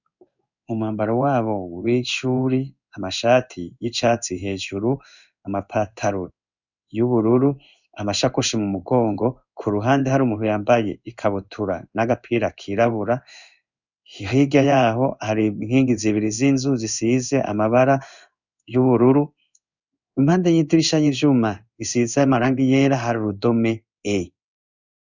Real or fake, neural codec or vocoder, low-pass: fake; codec, 16 kHz in and 24 kHz out, 1 kbps, XY-Tokenizer; 7.2 kHz